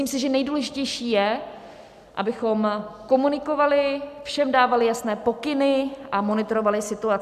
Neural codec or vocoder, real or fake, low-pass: none; real; 14.4 kHz